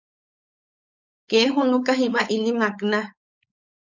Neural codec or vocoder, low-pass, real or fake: codec, 16 kHz, 4.8 kbps, FACodec; 7.2 kHz; fake